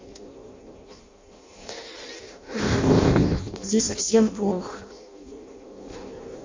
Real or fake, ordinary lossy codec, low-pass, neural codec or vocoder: fake; MP3, 64 kbps; 7.2 kHz; codec, 16 kHz in and 24 kHz out, 0.6 kbps, FireRedTTS-2 codec